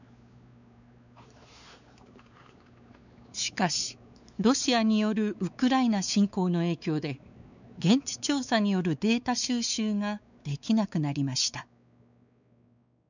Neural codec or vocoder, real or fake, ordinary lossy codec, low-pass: codec, 16 kHz, 4 kbps, X-Codec, WavLM features, trained on Multilingual LibriSpeech; fake; none; 7.2 kHz